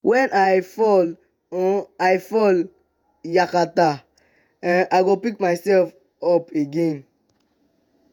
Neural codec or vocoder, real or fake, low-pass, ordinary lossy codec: vocoder, 48 kHz, 128 mel bands, Vocos; fake; 19.8 kHz; none